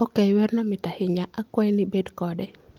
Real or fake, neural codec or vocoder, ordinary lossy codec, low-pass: real; none; Opus, 32 kbps; 19.8 kHz